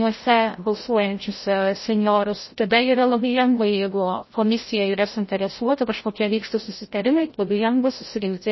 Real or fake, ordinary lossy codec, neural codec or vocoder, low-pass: fake; MP3, 24 kbps; codec, 16 kHz, 0.5 kbps, FreqCodec, larger model; 7.2 kHz